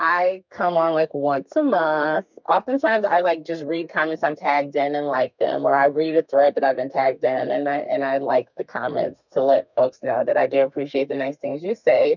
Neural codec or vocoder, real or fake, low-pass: codec, 32 kHz, 1.9 kbps, SNAC; fake; 7.2 kHz